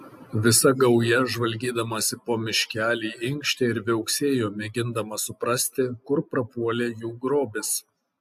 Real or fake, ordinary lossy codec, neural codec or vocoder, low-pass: real; AAC, 96 kbps; none; 14.4 kHz